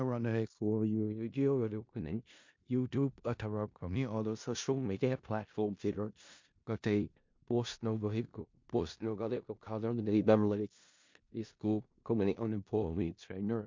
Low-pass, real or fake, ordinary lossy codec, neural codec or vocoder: 7.2 kHz; fake; MP3, 48 kbps; codec, 16 kHz in and 24 kHz out, 0.4 kbps, LongCat-Audio-Codec, four codebook decoder